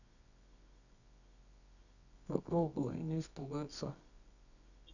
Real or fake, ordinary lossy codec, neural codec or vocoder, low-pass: fake; none; codec, 24 kHz, 0.9 kbps, WavTokenizer, medium music audio release; 7.2 kHz